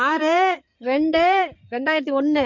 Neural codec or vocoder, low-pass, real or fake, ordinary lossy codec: codec, 16 kHz in and 24 kHz out, 2.2 kbps, FireRedTTS-2 codec; 7.2 kHz; fake; MP3, 48 kbps